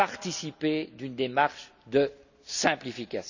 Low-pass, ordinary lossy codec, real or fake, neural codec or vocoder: 7.2 kHz; none; real; none